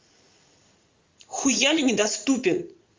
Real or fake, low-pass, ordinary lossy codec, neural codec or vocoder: fake; 7.2 kHz; Opus, 32 kbps; vocoder, 44.1 kHz, 80 mel bands, Vocos